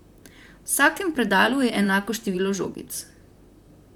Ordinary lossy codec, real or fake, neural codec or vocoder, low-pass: none; fake; vocoder, 44.1 kHz, 128 mel bands, Pupu-Vocoder; 19.8 kHz